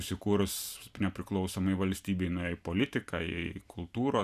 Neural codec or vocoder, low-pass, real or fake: vocoder, 44.1 kHz, 128 mel bands every 256 samples, BigVGAN v2; 14.4 kHz; fake